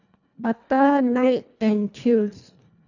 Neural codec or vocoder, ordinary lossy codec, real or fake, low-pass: codec, 24 kHz, 1.5 kbps, HILCodec; none; fake; 7.2 kHz